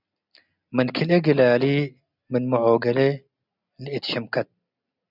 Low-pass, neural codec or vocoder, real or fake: 5.4 kHz; none; real